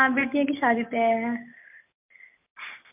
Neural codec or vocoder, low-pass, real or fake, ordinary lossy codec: none; 3.6 kHz; real; MP3, 32 kbps